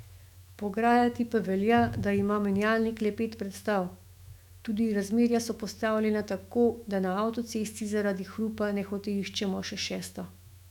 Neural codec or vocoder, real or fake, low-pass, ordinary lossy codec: autoencoder, 48 kHz, 128 numbers a frame, DAC-VAE, trained on Japanese speech; fake; 19.8 kHz; none